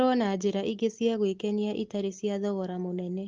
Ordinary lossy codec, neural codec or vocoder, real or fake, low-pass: Opus, 16 kbps; none; real; 7.2 kHz